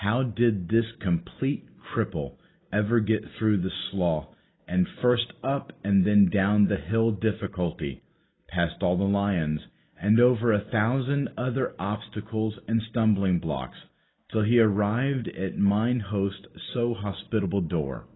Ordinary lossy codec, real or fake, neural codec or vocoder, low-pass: AAC, 16 kbps; real; none; 7.2 kHz